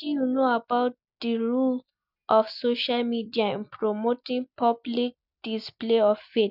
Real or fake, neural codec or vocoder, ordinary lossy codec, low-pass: real; none; none; 5.4 kHz